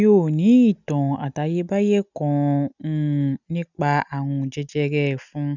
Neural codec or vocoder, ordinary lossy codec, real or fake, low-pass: none; none; real; 7.2 kHz